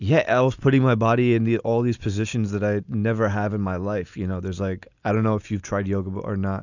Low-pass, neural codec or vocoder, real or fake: 7.2 kHz; none; real